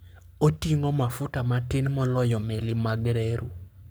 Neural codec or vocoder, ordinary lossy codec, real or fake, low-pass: codec, 44.1 kHz, 7.8 kbps, Pupu-Codec; none; fake; none